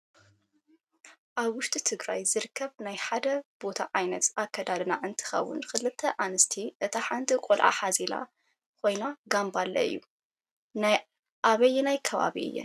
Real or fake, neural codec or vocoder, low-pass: real; none; 14.4 kHz